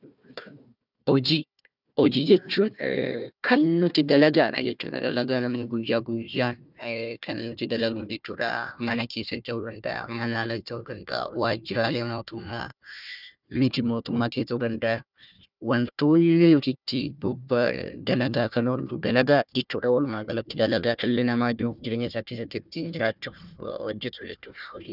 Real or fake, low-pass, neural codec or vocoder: fake; 5.4 kHz; codec, 16 kHz, 1 kbps, FunCodec, trained on Chinese and English, 50 frames a second